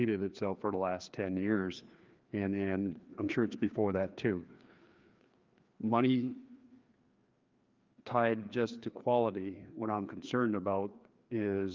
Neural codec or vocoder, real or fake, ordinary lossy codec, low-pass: codec, 16 kHz, 2 kbps, FreqCodec, larger model; fake; Opus, 32 kbps; 7.2 kHz